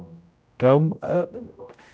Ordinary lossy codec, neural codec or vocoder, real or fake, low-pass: none; codec, 16 kHz, 0.5 kbps, X-Codec, HuBERT features, trained on general audio; fake; none